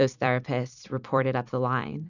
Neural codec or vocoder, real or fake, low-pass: vocoder, 44.1 kHz, 128 mel bands every 256 samples, BigVGAN v2; fake; 7.2 kHz